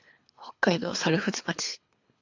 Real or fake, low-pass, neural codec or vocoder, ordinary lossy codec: fake; 7.2 kHz; codec, 24 kHz, 3 kbps, HILCodec; AAC, 48 kbps